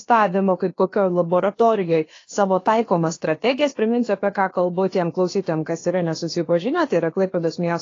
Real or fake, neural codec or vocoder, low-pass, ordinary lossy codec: fake; codec, 16 kHz, about 1 kbps, DyCAST, with the encoder's durations; 7.2 kHz; AAC, 32 kbps